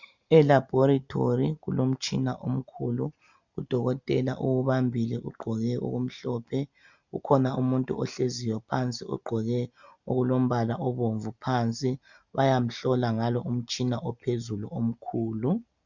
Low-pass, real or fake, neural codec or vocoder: 7.2 kHz; real; none